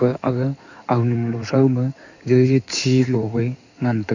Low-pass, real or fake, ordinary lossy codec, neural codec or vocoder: 7.2 kHz; fake; none; codec, 16 kHz in and 24 kHz out, 2.2 kbps, FireRedTTS-2 codec